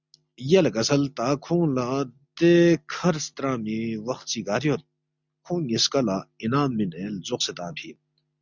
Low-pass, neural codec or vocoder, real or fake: 7.2 kHz; none; real